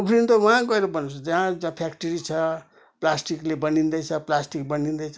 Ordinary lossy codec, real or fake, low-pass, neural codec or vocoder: none; real; none; none